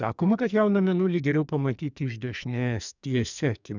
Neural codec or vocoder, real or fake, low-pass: codec, 44.1 kHz, 2.6 kbps, SNAC; fake; 7.2 kHz